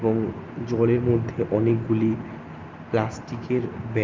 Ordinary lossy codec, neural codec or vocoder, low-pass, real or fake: Opus, 24 kbps; none; 7.2 kHz; real